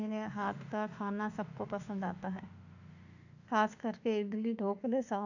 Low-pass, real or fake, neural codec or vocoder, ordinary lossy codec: 7.2 kHz; fake; autoencoder, 48 kHz, 32 numbers a frame, DAC-VAE, trained on Japanese speech; none